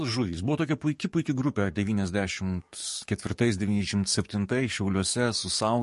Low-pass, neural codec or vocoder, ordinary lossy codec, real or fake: 14.4 kHz; codec, 44.1 kHz, 7.8 kbps, DAC; MP3, 48 kbps; fake